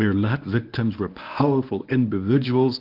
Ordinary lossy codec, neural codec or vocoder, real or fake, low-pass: Opus, 32 kbps; codec, 24 kHz, 0.9 kbps, WavTokenizer, medium speech release version 1; fake; 5.4 kHz